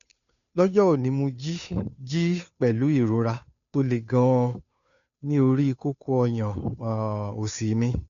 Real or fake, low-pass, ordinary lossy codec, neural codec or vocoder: fake; 7.2 kHz; MP3, 96 kbps; codec, 16 kHz, 2 kbps, FunCodec, trained on Chinese and English, 25 frames a second